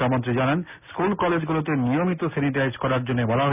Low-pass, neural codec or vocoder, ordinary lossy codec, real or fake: 3.6 kHz; none; none; real